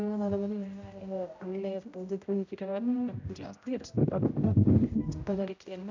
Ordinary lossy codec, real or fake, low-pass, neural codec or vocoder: AAC, 48 kbps; fake; 7.2 kHz; codec, 16 kHz, 0.5 kbps, X-Codec, HuBERT features, trained on general audio